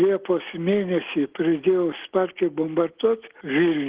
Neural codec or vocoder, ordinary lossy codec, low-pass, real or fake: none; Opus, 16 kbps; 3.6 kHz; real